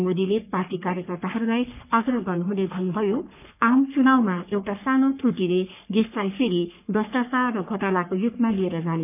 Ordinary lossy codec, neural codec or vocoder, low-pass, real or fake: none; codec, 44.1 kHz, 3.4 kbps, Pupu-Codec; 3.6 kHz; fake